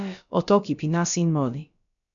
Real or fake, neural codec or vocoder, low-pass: fake; codec, 16 kHz, about 1 kbps, DyCAST, with the encoder's durations; 7.2 kHz